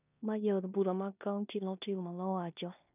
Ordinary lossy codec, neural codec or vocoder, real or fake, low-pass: none; codec, 16 kHz in and 24 kHz out, 0.9 kbps, LongCat-Audio-Codec, four codebook decoder; fake; 3.6 kHz